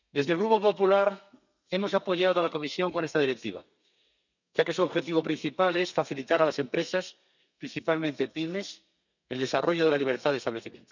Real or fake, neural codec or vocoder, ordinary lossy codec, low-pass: fake; codec, 32 kHz, 1.9 kbps, SNAC; none; 7.2 kHz